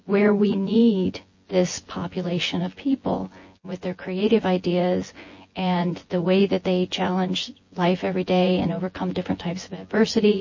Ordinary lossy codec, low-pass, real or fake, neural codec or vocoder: MP3, 32 kbps; 7.2 kHz; fake; vocoder, 24 kHz, 100 mel bands, Vocos